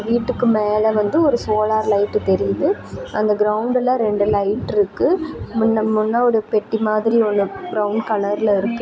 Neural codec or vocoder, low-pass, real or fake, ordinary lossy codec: none; none; real; none